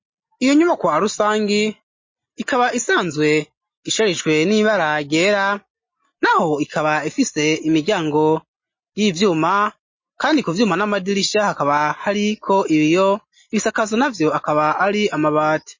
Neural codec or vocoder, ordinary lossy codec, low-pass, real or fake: none; MP3, 32 kbps; 7.2 kHz; real